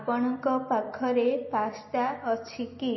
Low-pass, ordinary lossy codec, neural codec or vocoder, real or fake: 7.2 kHz; MP3, 24 kbps; none; real